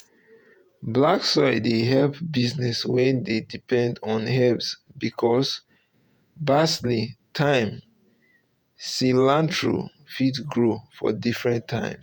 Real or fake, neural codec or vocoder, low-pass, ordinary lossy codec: fake; vocoder, 48 kHz, 128 mel bands, Vocos; none; none